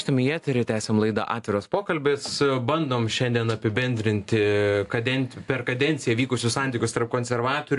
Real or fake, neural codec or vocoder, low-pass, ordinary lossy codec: real; none; 10.8 kHz; AAC, 64 kbps